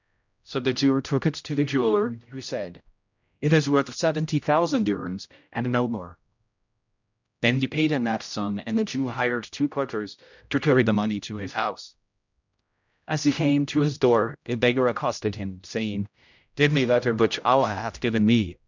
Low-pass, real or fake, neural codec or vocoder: 7.2 kHz; fake; codec, 16 kHz, 0.5 kbps, X-Codec, HuBERT features, trained on general audio